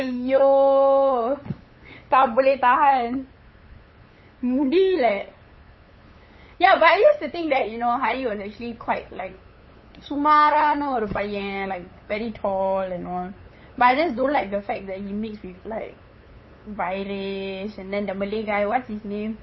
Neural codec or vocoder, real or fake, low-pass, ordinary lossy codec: codec, 16 kHz, 8 kbps, FunCodec, trained on LibriTTS, 25 frames a second; fake; 7.2 kHz; MP3, 24 kbps